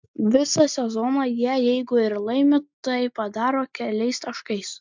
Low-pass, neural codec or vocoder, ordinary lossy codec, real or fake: 7.2 kHz; none; MP3, 64 kbps; real